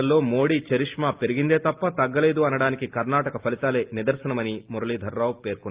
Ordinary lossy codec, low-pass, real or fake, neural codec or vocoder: Opus, 32 kbps; 3.6 kHz; real; none